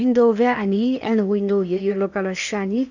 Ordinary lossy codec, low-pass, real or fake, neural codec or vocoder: none; 7.2 kHz; fake; codec, 16 kHz in and 24 kHz out, 0.8 kbps, FocalCodec, streaming, 65536 codes